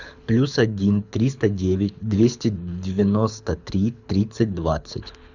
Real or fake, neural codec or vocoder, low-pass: fake; codec, 24 kHz, 6 kbps, HILCodec; 7.2 kHz